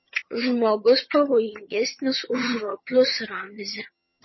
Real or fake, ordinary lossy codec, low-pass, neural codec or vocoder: fake; MP3, 24 kbps; 7.2 kHz; vocoder, 22.05 kHz, 80 mel bands, HiFi-GAN